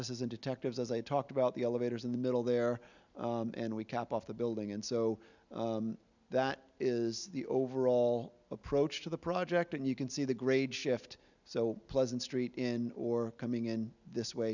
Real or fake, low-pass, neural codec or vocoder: real; 7.2 kHz; none